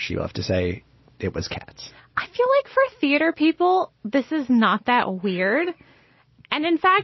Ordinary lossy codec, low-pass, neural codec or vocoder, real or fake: MP3, 24 kbps; 7.2 kHz; none; real